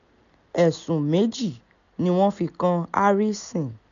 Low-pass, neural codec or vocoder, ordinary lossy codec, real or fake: 7.2 kHz; none; none; real